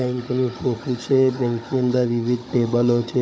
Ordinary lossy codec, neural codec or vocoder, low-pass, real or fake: none; codec, 16 kHz, 16 kbps, FunCodec, trained on Chinese and English, 50 frames a second; none; fake